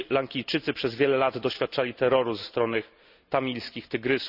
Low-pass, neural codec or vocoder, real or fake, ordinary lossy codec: 5.4 kHz; none; real; none